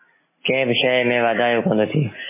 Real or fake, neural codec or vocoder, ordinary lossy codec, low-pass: real; none; MP3, 16 kbps; 3.6 kHz